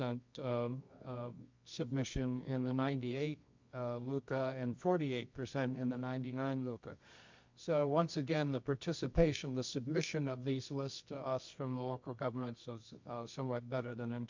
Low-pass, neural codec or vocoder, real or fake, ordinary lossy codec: 7.2 kHz; codec, 24 kHz, 0.9 kbps, WavTokenizer, medium music audio release; fake; MP3, 64 kbps